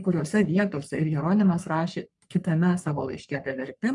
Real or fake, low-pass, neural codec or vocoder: fake; 10.8 kHz; codec, 44.1 kHz, 3.4 kbps, Pupu-Codec